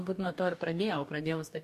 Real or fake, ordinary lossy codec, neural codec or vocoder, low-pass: fake; MP3, 64 kbps; codec, 44.1 kHz, 2.6 kbps, DAC; 14.4 kHz